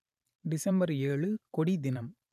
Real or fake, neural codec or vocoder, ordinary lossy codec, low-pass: fake; vocoder, 44.1 kHz, 128 mel bands every 512 samples, BigVGAN v2; none; 14.4 kHz